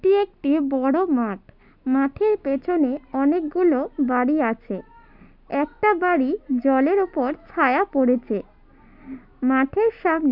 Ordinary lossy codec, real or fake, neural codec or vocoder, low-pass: none; real; none; 5.4 kHz